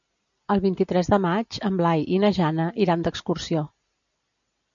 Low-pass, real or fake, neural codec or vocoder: 7.2 kHz; real; none